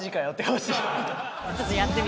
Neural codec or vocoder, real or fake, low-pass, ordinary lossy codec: none; real; none; none